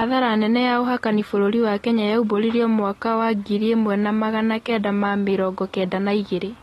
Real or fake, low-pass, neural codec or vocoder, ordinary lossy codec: real; 19.8 kHz; none; AAC, 32 kbps